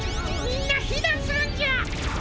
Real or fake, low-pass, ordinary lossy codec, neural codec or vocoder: real; none; none; none